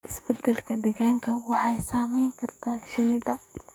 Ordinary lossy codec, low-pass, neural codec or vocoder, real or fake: none; none; codec, 44.1 kHz, 2.6 kbps, SNAC; fake